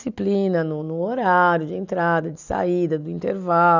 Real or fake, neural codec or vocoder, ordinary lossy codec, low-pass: real; none; none; 7.2 kHz